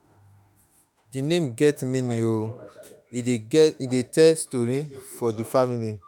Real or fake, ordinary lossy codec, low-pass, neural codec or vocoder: fake; none; none; autoencoder, 48 kHz, 32 numbers a frame, DAC-VAE, trained on Japanese speech